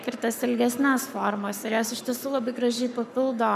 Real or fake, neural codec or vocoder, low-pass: fake; codec, 44.1 kHz, 7.8 kbps, Pupu-Codec; 14.4 kHz